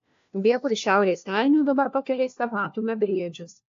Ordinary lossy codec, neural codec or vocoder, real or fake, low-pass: AAC, 48 kbps; codec, 16 kHz, 1 kbps, FunCodec, trained on LibriTTS, 50 frames a second; fake; 7.2 kHz